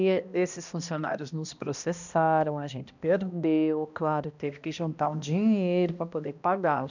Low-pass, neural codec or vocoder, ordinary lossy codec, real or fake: 7.2 kHz; codec, 16 kHz, 1 kbps, X-Codec, HuBERT features, trained on balanced general audio; none; fake